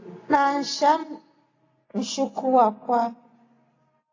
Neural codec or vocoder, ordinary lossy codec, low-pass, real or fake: none; AAC, 32 kbps; 7.2 kHz; real